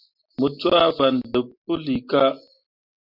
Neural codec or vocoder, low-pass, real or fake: none; 5.4 kHz; real